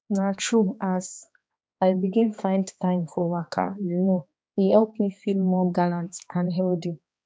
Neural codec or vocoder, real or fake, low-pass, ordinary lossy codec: codec, 16 kHz, 2 kbps, X-Codec, HuBERT features, trained on balanced general audio; fake; none; none